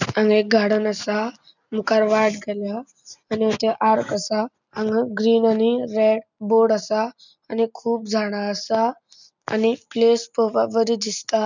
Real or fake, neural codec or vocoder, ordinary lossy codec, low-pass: real; none; none; 7.2 kHz